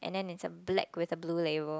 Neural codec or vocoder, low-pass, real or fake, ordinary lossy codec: none; none; real; none